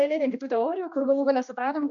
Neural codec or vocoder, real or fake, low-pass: codec, 16 kHz, 2 kbps, X-Codec, HuBERT features, trained on general audio; fake; 7.2 kHz